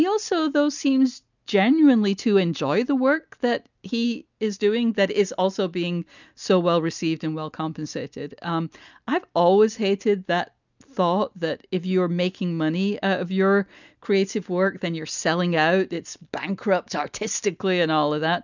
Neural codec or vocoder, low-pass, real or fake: none; 7.2 kHz; real